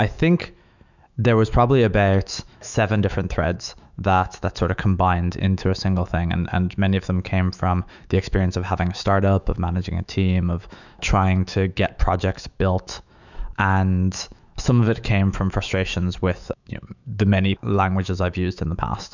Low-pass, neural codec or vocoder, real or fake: 7.2 kHz; autoencoder, 48 kHz, 128 numbers a frame, DAC-VAE, trained on Japanese speech; fake